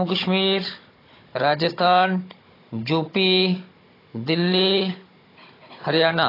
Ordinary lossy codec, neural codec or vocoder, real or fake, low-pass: AAC, 24 kbps; codec, 16 kHz, 16 kbps, FunCodec, trained on Chinese and English, 50 frames a second; fake; 5.4 kHz